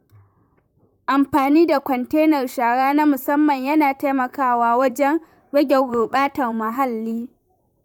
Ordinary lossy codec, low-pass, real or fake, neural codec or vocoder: none; 19.8 kHz; fake; vocoder, 44.1 kHz, 128 mel bands, Pupu-Vocoder